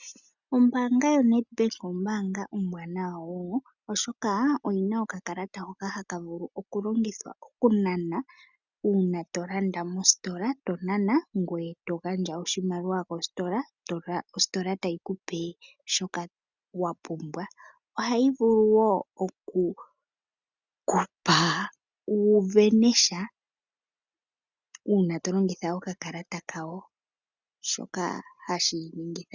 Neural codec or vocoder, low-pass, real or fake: none; 7.2 kHz; real